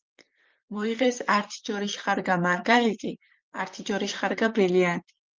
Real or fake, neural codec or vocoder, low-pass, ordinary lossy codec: fake; vocoder, 44.1 kHz, 128 mel bands, Pupu-Vocoder; 7.2 kHz; Opus, 32 kbps